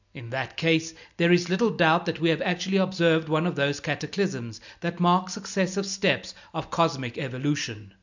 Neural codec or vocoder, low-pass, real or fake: none; 7.2 kHz; real